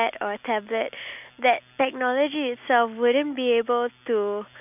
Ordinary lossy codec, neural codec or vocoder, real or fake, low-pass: none; none; real; 3.6 kHz